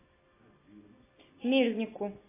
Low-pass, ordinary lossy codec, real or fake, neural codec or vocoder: 3.6 kHz; AAC, 16 kbps; real; none